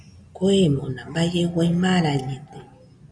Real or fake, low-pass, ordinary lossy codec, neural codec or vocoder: real; 9.9 kHz; MP3, 96 kbps; none